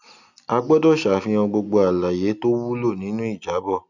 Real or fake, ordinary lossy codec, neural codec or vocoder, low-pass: real; none; none; 7.2 kHz